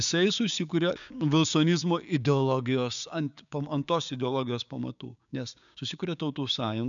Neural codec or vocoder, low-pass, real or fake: codec, 16 kHz, 6 kbps, DAC; 7.2 kHz; fake